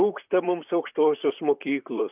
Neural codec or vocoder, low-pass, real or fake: vocoder, 44.1 kHz, 128 mel bands, Pupu-Vocoder; 3.6 kHz; fake